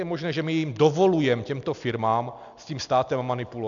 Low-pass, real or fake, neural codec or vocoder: 7.2 kHz; real; none